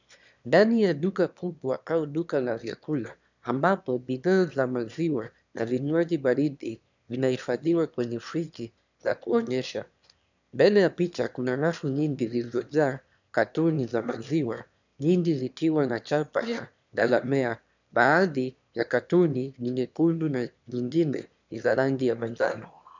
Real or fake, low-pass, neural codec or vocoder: fake; 7.2 kHz; autoencoder, 22.05 kHz, a latent of 192 numbers a frame, VITS, trained on one speaker